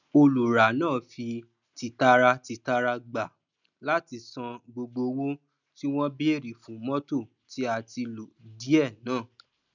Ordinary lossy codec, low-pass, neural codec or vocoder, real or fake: none; 7.2 kHz; none; real